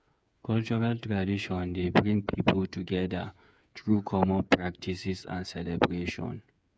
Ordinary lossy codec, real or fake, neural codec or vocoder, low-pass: none; fake; codec, 16 kHz, 8 kbps, FreqCodec, smaller model; none